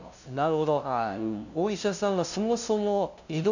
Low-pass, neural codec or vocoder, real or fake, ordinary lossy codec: 7.2 kHz; codec, 16 kHz, 0.5 kbps, FunCodec, trained on LibriTTS, 25 frames a second; fake; none